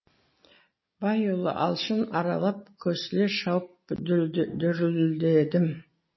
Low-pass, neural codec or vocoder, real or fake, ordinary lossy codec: 7.2 kHz; none; real; MP3, 24 kbps